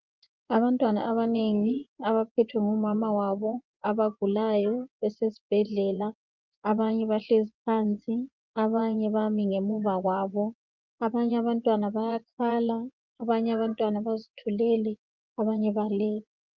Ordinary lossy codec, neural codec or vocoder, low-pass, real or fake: Opus, 32 kbps; vocoder, 24 kHz, 100 mel bands, Vocos; 7.2 kHz; fake